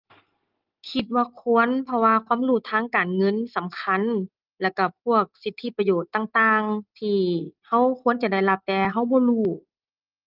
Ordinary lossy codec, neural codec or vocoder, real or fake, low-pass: Opus, 32 kbps; none; real; 5.4 kHz